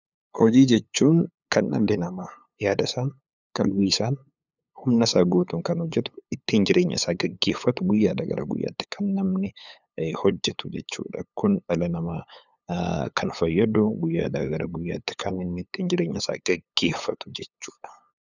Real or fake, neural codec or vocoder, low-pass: fake; codec, 16 kHz, 8 kbps, FunCodec, trained on LibriTTS, 25 frames a second; 7.2 kHz